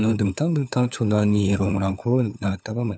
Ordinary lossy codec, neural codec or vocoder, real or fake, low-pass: none; codec, 16 kHz, 4 kbps, FunCodec, trained on LibriTTS, 50 frames a second; fake; none